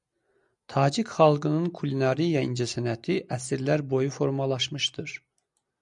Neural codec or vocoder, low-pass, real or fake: none; 10.8 kHz; real